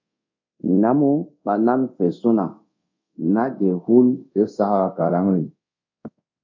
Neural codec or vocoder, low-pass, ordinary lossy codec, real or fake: codec, 24 kHz, 0.5 kbps, DualCodec; 7.2 kHz; MP3, 48 kbps; fake